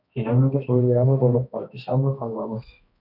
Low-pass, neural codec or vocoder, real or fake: 5.4 kHz; codec, 16 kHz, 1 kbps, X-Codec, HuBERT features, trained on balanced general audio; fake